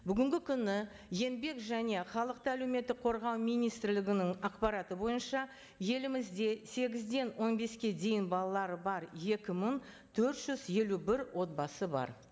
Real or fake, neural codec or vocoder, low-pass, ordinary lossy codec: real; none; none; none